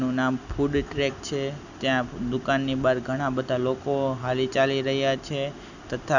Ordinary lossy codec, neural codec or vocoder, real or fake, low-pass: none; none; real; 7.2 kHz